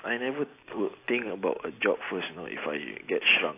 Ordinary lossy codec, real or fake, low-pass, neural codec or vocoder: AAC, 24 kbps; real; 3.6 kHz; none